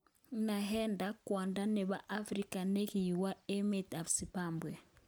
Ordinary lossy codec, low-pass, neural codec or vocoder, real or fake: none; none; none; real